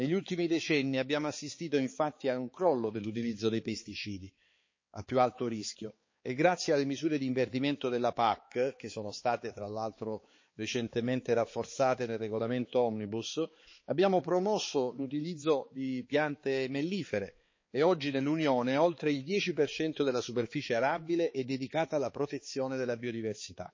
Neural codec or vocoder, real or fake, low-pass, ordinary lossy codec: codec, 16 kHz, 4 kbps, X-Codec, HuBERT features, trained on balanced general audio; fake; 7.2 kHz; MP3, 32 kbps